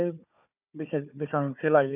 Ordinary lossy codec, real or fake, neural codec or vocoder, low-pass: none; fake; codec, 16 kHz, 4 kbps, FunCodec, trained on Chinese and English, 50 frames a second; 3.6 kHz